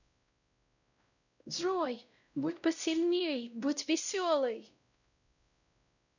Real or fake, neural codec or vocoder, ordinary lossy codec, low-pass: fake; codec, 16 kHz, 0.5 kbps, X-Codec, WavLM features, trained on Multilingual LibriSpeech; none; 7.2 kHz